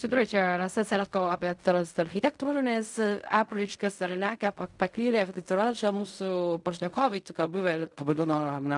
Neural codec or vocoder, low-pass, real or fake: codec, 16 kHz in and 24 kHz out, 0.4 kbps, LongCat-Audio-Codec, fine tuned four codebook decoder; 10.8 kHz; fake